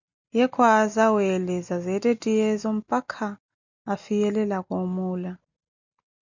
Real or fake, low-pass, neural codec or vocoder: real; 7.2 kHz; none